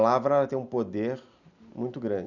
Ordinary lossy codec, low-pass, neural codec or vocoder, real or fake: none; 7.2 kHz; none; real